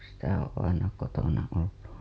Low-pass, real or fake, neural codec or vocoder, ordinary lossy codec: none; real; none; none